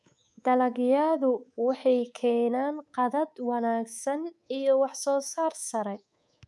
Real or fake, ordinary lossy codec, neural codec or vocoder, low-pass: fake; none; codec, 24 kHz, 3.1 kbps, DualCodec; none